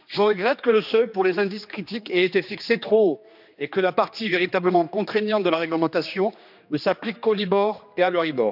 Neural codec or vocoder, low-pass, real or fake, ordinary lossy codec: codec, 16 kHz, 2 kbps, X-Codec, HuBERT features, trained on general audio; 5.4 kHz; fake; none